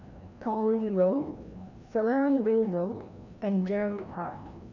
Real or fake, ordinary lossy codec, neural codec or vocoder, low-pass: fake; none; codec, 16 kHz, 1 kbps, FreqCodec, larger model; 7.2 kHz